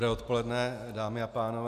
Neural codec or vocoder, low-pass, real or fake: none; 14.4 kHz; real